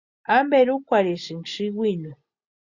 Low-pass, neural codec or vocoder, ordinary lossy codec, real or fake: 7.2 kHz; none; Opus, 64 kbps; real